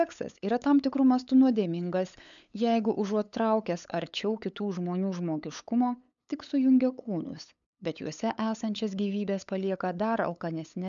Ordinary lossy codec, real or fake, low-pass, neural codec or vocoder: MP3, 96 kbps; fake; 7.2 kHz; codec, 16 kHz, 8 kbps, FunCodec, trained on LibriTTS, 25 frames a second